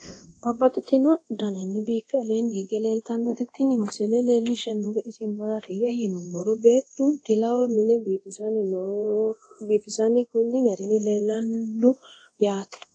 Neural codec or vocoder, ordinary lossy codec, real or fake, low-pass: codec, 24 kHz, 0.9 kbps, DualCodec; AAC, 48 kbps; fake; 9.9 kHz